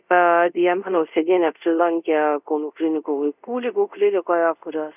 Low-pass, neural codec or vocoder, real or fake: 3.6 kHz; codec, 24 kHz, 0.5 kbps, DualCodec; fake